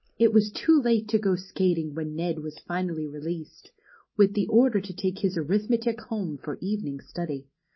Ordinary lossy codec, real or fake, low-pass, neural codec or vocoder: MP3, 24 kbps; real; 7.2 kHz; none